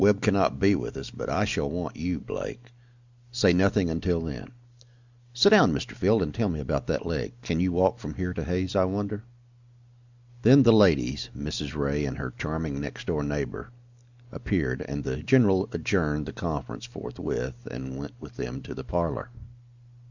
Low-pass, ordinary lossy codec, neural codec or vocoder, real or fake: 7.2 kHz; Opus, 64 kbps; none; real